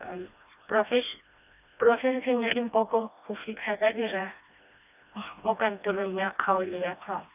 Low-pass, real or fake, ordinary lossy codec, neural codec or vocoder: 3.6 kHz; fake; none; codec, 16 kHz, 1 kbps, FreqCodec, smaller model